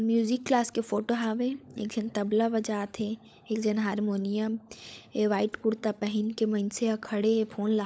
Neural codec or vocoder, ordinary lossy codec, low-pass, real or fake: codec, 16 kHz, 16 kbps, FunCodec, trained on LibriTTS, 50 frames a second; none; none; fake